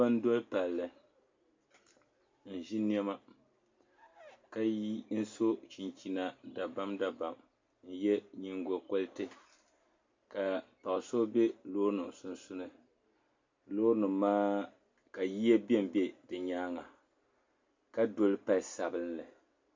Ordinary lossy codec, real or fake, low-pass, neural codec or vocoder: MP3, 48 kbps; real; 7.2 kHz; none